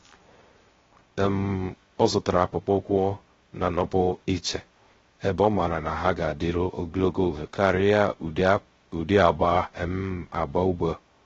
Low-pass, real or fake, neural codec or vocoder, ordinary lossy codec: 7.2 kHz; fake; codec, 16 kHz, 0.3 kbps, FocalCodec; AAC, 24 kbps